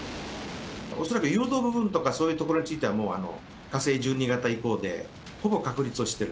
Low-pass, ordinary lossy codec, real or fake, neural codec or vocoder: none; none; real; none